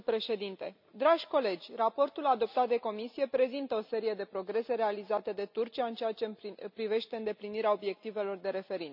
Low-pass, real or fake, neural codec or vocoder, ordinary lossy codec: 5.4 kHz; real; none; none